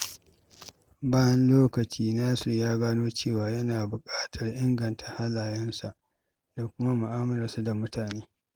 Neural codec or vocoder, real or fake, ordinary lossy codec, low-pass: none; real; Opus, 24 kbps; 19.8 kHz